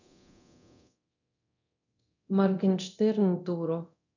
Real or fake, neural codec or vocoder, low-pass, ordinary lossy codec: fake; codec, 24 kHz, 0.9 kbps, DualCodec; 7.2 kHz; none